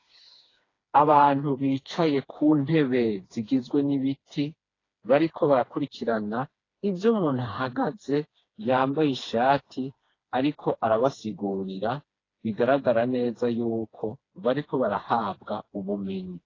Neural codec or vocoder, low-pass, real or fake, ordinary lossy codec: codec, 16 kHz, 2 kbps, FreqCodec, smaller model; 7.2 kHz; fake; AAC, 32 kbps